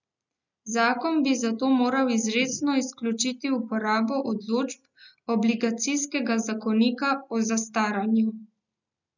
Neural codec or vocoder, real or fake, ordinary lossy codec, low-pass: none; real; none; 7.2 kHz